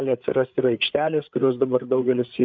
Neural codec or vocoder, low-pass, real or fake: codec, 16 kHz, 8 kbps, FreqCodec, larger model; 7.2 kHz; fake